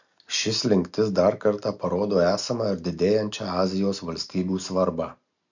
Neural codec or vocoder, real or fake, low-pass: none; real; 7.2 kHz